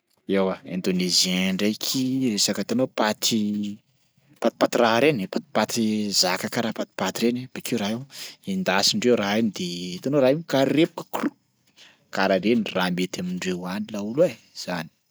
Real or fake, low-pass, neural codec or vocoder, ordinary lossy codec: real; none; none; none